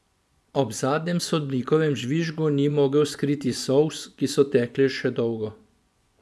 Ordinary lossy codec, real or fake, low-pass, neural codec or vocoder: none; fake; none; vocoder, 24 kHz, 100 mel bands, Vocos